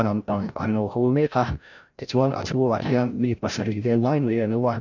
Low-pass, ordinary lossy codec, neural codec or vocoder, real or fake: 7.2 kHz; none; codec, 16 kHz, 0.5 kbps, FreqCodec, larger model; fake